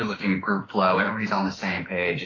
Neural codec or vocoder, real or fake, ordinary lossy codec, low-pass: autoencoder, 48 kHz, 32 numbers a frame, DAC-VAE, trained on Japanese speech; fake; AAC, 32 kbps; 7.2 kHz